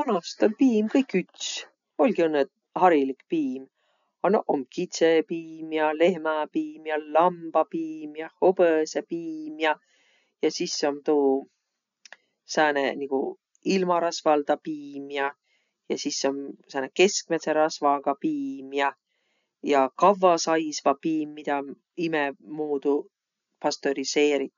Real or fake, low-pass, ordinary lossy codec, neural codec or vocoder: real; 7.2 kHz; none; none